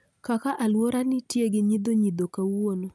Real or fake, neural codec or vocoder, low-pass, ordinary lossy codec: real; none; none; none